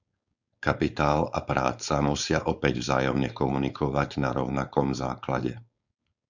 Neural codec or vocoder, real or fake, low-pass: codec, 16 kHz, 4.8 kbps, FACodec; fake; 7.2 kHz